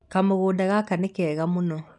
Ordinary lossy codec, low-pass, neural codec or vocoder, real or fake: none; 10.8 kHz; none; real